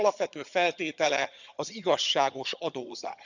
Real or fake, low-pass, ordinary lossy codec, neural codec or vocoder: fake; 7.2 kHz; none; vocoder, 22.05 kHz, 80 mel bands, HiFi-GAN